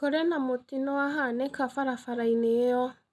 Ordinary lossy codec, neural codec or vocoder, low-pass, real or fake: none; none; none; real